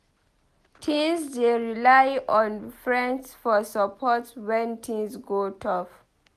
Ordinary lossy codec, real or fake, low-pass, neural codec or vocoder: none; real; 14.4 kHz; none